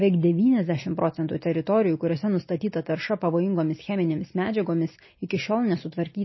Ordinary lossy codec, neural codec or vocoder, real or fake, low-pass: MP3, 24 kbps; none; real; 7.2 kHz